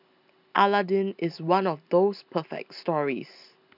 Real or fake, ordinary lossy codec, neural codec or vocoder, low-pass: real; none; none; 5.4 kHz